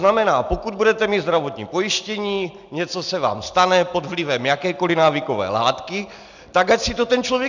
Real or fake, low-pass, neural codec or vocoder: real; 7.2 kHz; none